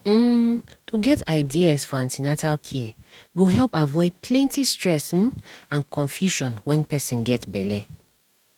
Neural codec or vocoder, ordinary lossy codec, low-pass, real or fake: codec, 44.1 kHz, 2.6 kbps, DAC; none; 19.8 kHz; fake